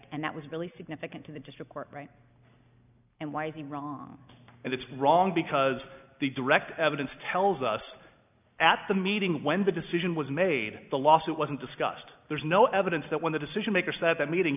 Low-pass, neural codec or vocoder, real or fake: 3.6 kHz; none; real